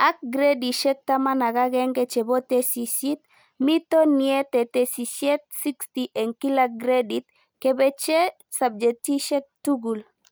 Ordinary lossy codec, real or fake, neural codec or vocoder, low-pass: none; real; none; none